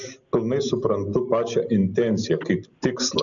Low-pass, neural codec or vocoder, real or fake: 7.2 kHz; none; real